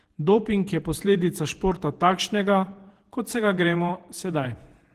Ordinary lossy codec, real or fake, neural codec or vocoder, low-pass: Opus, 16 kbps; fake; vocoder, 48 kHz, 128 mel bands, Vocos; 14.4 kHz